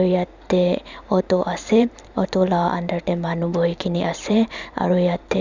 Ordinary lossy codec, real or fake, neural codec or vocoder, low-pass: none; fake; vocoder, 44.1 kHz, 128 mel bands every 512 samples, BigVGAN v2; 7.2 kHz